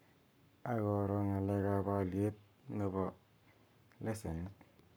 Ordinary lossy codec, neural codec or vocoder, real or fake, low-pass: none; codec, 44.1 kHz, 7.8 kbps, Pupu-Codec; fake; none